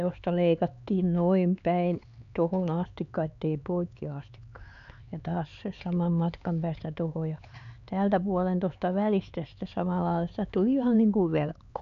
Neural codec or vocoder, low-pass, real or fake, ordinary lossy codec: codec, 16 kHz, 4 kbps, X-Codec, HuBERT features, trained on LibriSpeech; 7.2 kHz; fake; none